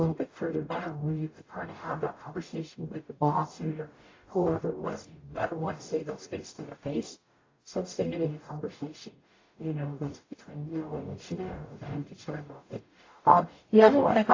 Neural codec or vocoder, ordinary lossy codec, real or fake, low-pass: codec, 44.1 kHz, 0.9 kbps, DAC; AAC, 32 kbps; fake; 7.2 kHz